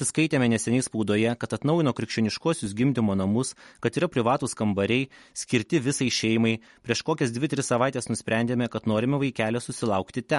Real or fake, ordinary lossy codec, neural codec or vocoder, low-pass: real; MP3, 48 kbps; none; 19.8 kHz